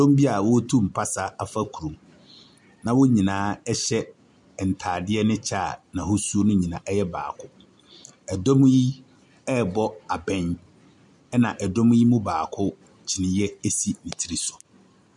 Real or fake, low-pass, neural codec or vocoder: real; 10.8 kHz; none